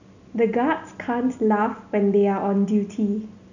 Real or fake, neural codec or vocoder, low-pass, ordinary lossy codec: real; none; 7.2 kHz; none